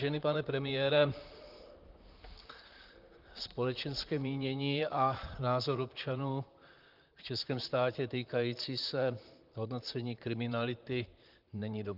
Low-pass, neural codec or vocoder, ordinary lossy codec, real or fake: 5.4 kHz; vocoder, 44.1 kHz, 128 mel bands, Pupu-Vocoder; Opus, 32 kbps; fake